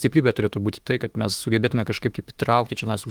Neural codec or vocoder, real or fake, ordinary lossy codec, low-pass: autoencoder, 48 kHz, 32 numbers a frame, DAC-VAE, trained on Japanese speech; fake; Opus, 24 kbps; 19.8 kHz